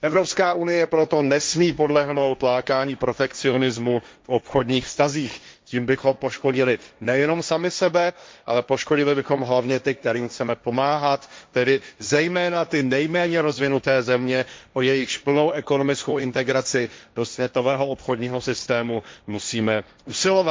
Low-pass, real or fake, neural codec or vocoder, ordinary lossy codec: none; fake; codec, 16 kHz, 1.1 kbps, Voila-Tokenizer; none